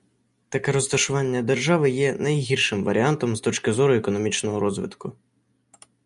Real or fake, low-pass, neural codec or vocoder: real; 10.8 kHz; none